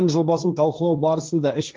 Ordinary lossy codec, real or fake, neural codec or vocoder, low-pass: Opus, 24 kbps; fake; codec, 16 kHz, 1.1 kbps, Voila-Tokenizer; 7.2 kHz